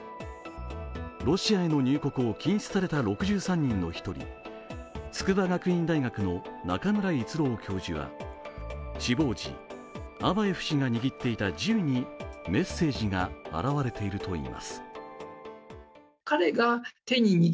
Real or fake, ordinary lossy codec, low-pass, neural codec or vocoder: real; none; none; none